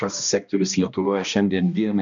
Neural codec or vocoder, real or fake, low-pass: codec, 16 kHz, 1 kbps, X-Codec, HuBERT features, trained on balanced general audio; fake; 7.2 kHz